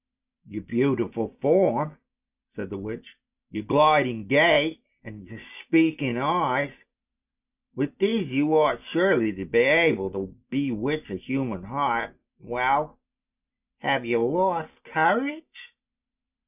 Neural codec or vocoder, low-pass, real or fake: none; 3.6 kHz; real